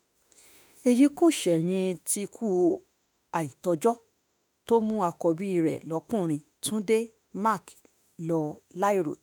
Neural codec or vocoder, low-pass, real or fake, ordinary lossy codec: autoencoder, 48 kHz, 32 numbers a frame, DAC-VAE, trained on Japanese speech; none; fake; none